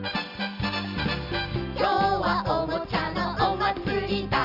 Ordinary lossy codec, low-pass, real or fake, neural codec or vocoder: none; 5.4 kHz; real; none